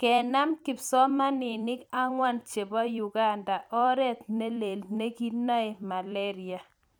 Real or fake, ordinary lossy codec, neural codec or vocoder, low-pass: fake; none; vocoder, 44.1 kHz, 128 mel bands every 256 samples, BigVGAN v2; none